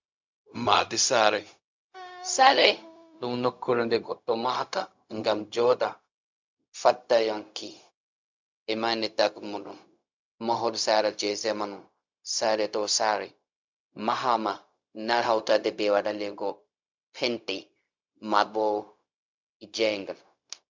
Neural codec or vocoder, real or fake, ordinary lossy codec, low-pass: codec, 16 kHz, 0.4 kbps, LongCat-Audio-Codec; fake; MP3, 64 kbps; 7.2 kHz